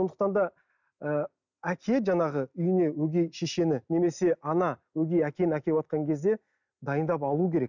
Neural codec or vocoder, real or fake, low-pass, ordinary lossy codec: none; real; 7.2 kHz; none